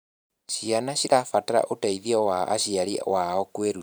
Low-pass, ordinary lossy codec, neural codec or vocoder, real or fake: none; none; vocoder, 44.1 kHz, 128 mel bands every 512 samples, BigVGAN v2; fake